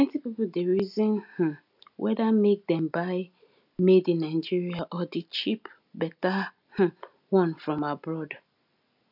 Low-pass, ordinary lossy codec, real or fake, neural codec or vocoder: 5.4 kHz; none; real; none